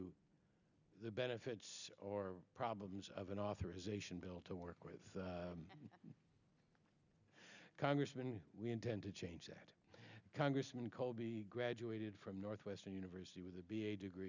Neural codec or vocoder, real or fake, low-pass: none; real; 7.2 kHz